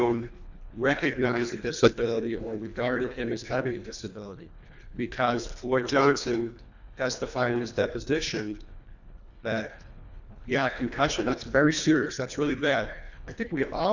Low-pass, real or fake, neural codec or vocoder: 7.2 kHz; fake; codec, 24 kHz, 1.5 kbps, HILCodec